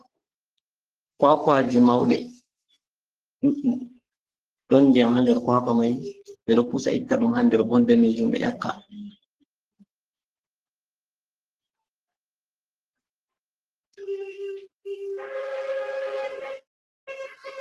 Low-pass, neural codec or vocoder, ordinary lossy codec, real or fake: 14.4 kHz; codec, 44.1 kHz, 3.4 kbps, Pupu-Codec; Opus, 16 kbps; fake